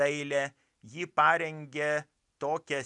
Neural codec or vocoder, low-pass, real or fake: none; 10.8 kHz; real